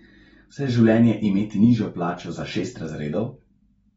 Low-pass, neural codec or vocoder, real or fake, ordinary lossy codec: 9.9 kHz; none; real; AAC, 24 kbps